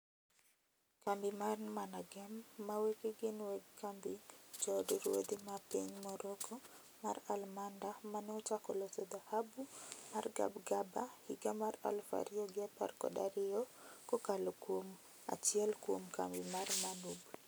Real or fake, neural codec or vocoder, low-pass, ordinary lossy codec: real; none; none; none